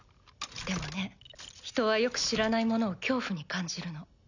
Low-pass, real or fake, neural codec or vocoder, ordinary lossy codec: 7.2 kHz; real; none; none